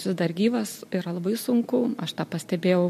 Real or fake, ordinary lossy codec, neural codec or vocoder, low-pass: real; MP3, 64 kbps; none; 14.4 kHz